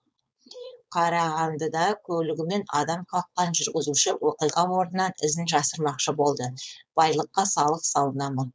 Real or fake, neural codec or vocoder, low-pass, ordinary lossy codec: fake; codec, 16 kHz, 4.8 kbps, FACodec; none; none